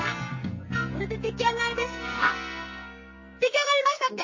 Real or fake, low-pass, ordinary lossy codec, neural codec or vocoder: fake; 7.2 kHz; MP3, 32 kbps; codec, 44.1 kHz, 2.6 kbps, SNAC